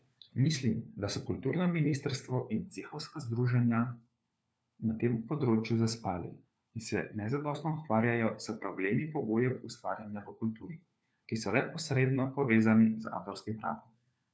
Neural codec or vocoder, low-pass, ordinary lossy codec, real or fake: codec, 16 kHz, 4 kbps, FunCodec, trained on LibriTTS, 50 frames a second; none; none; fake